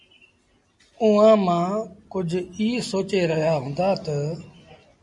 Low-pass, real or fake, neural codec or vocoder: 10.8 kHz; real; none